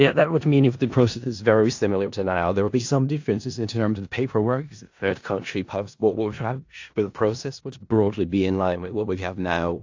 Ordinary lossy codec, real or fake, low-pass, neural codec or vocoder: AAC, 48 kbps; fake; 7.2 kHz; codec, 16 kHz in and 24 kHz out, 0.4 kbps, LongCat-Audio-Codec, four codebook decoder